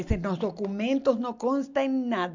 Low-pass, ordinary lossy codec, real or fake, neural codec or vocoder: 7.2 kHz; MP3, 64 kbps; real; none